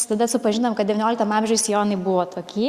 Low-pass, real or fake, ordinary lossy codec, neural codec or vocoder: 14.4 kHz; real; Opus, 64 kbps; none